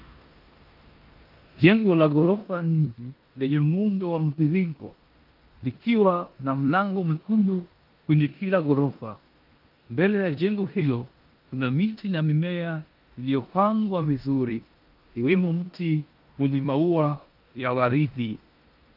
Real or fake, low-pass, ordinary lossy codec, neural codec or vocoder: fake; 5.4 kHz; Opus, 24 kbps; codec, 16 kHz in and 24 kHz out, 0.9 kbps, LongCat-Audio-Codec, four codebook decoder